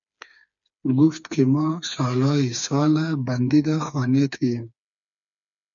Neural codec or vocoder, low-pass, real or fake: codec, 16 kHz, 4 kbps, FreqCodec, smaller model; 7.2 kHz; fake